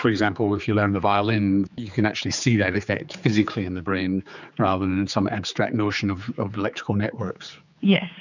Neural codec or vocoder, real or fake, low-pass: codec, 16 kHz, 4 kbps, X-Codec, HuBERT features, trained on general audio; fake; 7.2 kHz